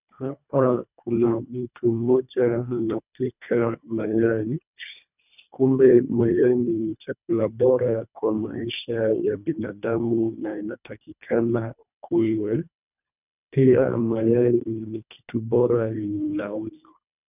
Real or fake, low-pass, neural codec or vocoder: fake; 3.6 kHz; codec, 24 kHz, 1.5 kbps, HILCodec